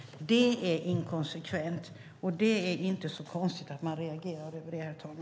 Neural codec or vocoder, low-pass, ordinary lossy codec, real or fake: none; none; none; real